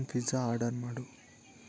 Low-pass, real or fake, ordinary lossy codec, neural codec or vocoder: none; real; none; none